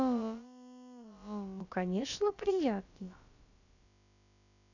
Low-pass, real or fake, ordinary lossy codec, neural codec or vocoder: 7.2 kHz; fake; none; codec, 16 kHz, about 1 kbps, DyCAST, with the encoder's durations